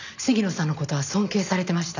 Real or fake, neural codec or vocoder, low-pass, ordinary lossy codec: real; none; 7.2 kHz; none